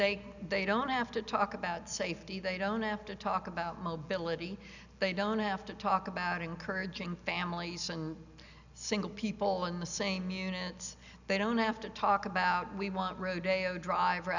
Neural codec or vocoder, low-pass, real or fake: none; 7.2 kHz; real